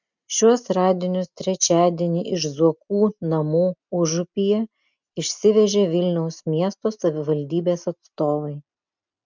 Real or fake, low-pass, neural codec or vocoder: real; 7.2 kHz; none